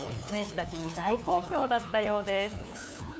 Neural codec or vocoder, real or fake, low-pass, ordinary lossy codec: codec, 16 kHz, 2 kbps, FunCodec, trained on LibriTTS, 25 frames a second; fake; none; none